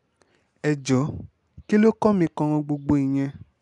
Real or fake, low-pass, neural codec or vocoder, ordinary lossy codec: real; 10.8 kHz; none; none